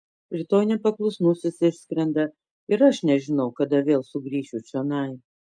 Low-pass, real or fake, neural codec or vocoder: 9.9 kHz; real; none